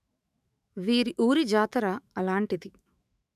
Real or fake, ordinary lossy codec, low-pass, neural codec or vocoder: fake; none; 14.4 kHz; autoencoder, 48 kHz, 128 numbers a frame, DAC-VAE, trained on Japanese speech